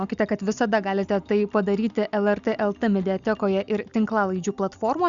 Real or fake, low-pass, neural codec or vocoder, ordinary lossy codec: real; 7.2 kHz; none; Opus, 64 kbps